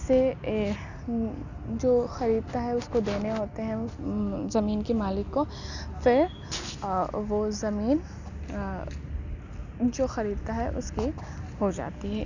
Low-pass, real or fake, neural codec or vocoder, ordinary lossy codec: 7.2 kHz; real; none; none